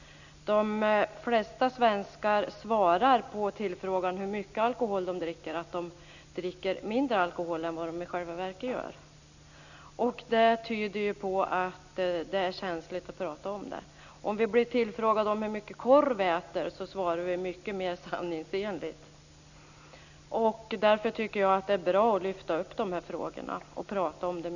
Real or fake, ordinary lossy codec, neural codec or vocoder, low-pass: real; none; none; 7.2 kHz